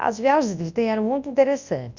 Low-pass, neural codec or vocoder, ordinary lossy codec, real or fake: 7.2 kHz; codec, 24 kHz, 0.9 kbps, WavTokenizer, large speech release; Opus, 64 kbps; fake